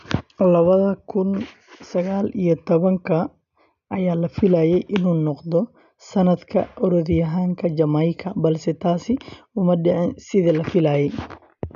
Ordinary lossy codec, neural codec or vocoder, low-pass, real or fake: none; none; 7.2 kHz; real